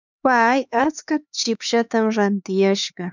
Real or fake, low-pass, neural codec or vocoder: fake; 7.2 kHz; codec, 16 kHz, 4 kbps, X-Codec, HuBERT features, trained on LibriSpeech